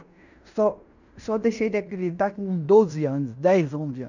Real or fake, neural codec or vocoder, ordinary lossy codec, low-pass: fake; codec, 16 kHz in and 24 kHz out, 0.9 kbps, LongCat-Audio-Codec, fine tuned four codebook decoder; none; 7.2 kHz